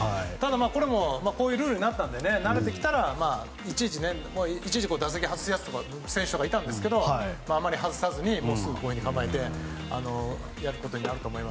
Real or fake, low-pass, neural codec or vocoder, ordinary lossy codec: real; none; none; none